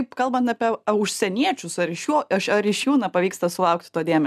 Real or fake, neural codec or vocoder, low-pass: real; none; 14.4 kHz